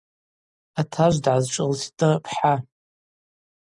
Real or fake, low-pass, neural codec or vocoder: real; 10.8 kHz; none